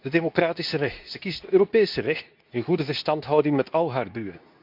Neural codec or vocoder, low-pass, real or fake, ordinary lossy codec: codec, 24 kHz, 0.9 kbps, WavTokenizer, medium speech release version 2; 5.4 kHz; fake; none